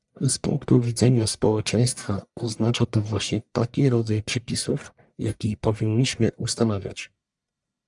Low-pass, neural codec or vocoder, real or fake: 10.8 kHz; codec, 44.1 kHz, 1.7 kbps, Pupu-Codec; fake